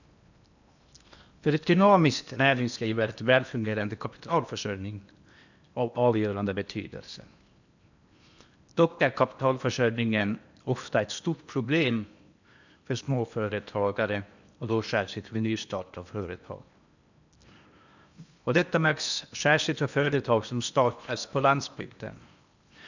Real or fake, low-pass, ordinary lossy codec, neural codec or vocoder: fake; 7.2 kHz; none; codec, 16 kHz in and 24 kHz out, 0.8 kbps, FocalCodec, streaming, 65536 codes